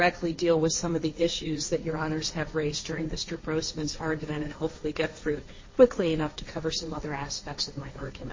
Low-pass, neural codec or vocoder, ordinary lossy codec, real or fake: 7.2 kHz; codec, 16 kHz, 1.1 kbps, Voila-Tokenizer; MP3, 32 kbps; fake